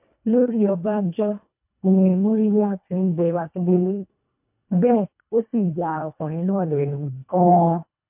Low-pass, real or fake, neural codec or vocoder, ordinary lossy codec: 3.6 kHz; fake; codec, 24 kHz, 1.5 kbps, HILCodec; MP3, 32 kbps